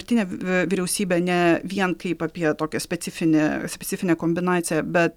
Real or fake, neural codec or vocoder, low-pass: real; none; 19.8 kHz